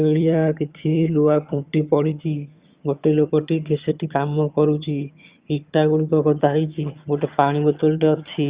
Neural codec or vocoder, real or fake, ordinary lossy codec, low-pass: vocoder, 22.05 kHz, 80 mel bands, HiFi-GAN; fake; Opus, 64 kbps; 3.6 kHz